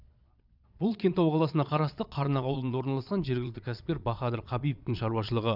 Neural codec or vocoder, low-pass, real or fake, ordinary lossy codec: vocoder, 22.05 kHz, 80 mel bands, Vocos; 5.4 kHz; fake; none